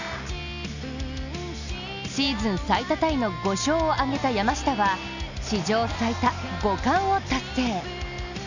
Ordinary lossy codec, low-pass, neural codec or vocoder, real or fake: none; 7.2 kHz; none; real